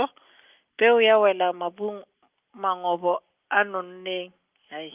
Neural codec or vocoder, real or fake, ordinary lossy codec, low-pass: none; real; Opus, 32 kbps; 3.6 kHz